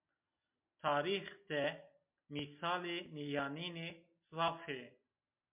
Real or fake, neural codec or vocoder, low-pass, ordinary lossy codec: real; none; 3.6 kHz; MP3, 32 kbps